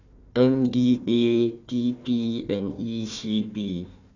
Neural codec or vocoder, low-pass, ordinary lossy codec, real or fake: codec, 16 kHz, 1 kbps, FunCodec, trained on Chinese and English, 50 frames a second; 7.2 kHz; none; fake